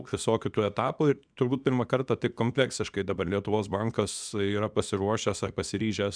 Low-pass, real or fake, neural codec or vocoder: 9.9 kHz; fake; codec, 24 kHz, 0.9 kbps, WavTokenizer, small release